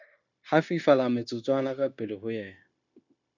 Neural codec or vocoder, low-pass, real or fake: codec, 16 kHz, 0.9 kbps, LongCat-Audio-Codec; 7.2 kHz; fake